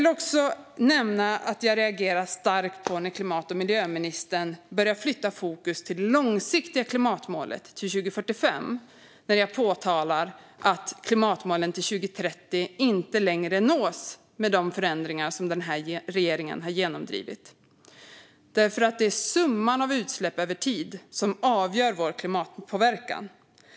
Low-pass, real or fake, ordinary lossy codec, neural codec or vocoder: none; real; none; none